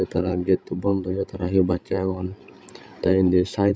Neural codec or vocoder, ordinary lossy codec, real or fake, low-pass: codec, 16 kHz, 16 kbps, FreqCodec, larger model; none; fake; none